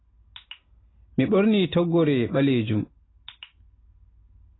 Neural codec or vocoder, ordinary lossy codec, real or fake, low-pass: none; AAC, 16 kbps; real; 7.2 kHz